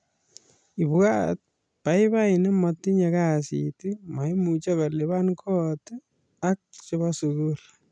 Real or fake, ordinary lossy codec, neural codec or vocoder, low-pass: real; none; none; 9.9 kHz